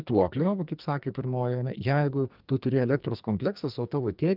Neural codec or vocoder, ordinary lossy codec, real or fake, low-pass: codec, 44.1 kHz, 2.6 kbps, SNAC; Opus, 24 kbps; fake; 5.4 kHz